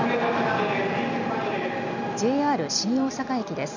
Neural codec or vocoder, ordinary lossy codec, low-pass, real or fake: none; none; 7.2 kHz; real